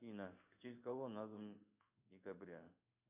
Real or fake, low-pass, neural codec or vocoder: fake; 3.6 kHz; codec, 16 kHz in and 24 kHz out, 1 kbps, XY-Tokenizer